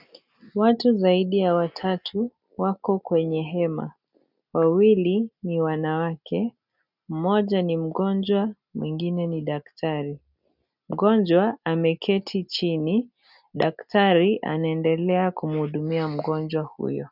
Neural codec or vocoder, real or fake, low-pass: none; real; 5.4 kHz